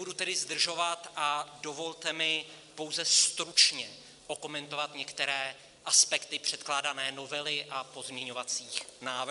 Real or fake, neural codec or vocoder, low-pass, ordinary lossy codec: real; none; 10.8 kHz; MP3, 96 kbps